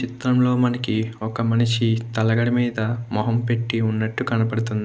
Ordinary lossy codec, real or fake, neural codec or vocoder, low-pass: none; real; none; none